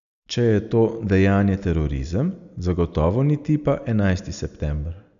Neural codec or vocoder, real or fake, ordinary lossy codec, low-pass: none; real; none; 7.2 kHz